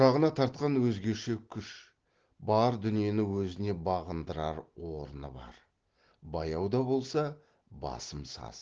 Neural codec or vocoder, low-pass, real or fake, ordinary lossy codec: none; 7.2 kHz; real; Opus, 16 kbps